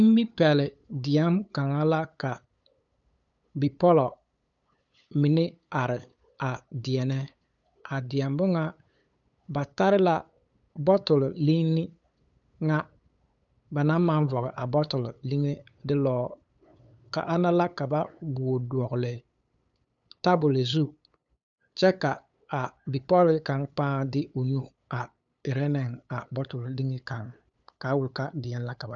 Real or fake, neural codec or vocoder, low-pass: fake; codec, 16 kHz, 8 kbps, FunCodec, trained on LibriTTS, 25 frames a second; 7.2 kHz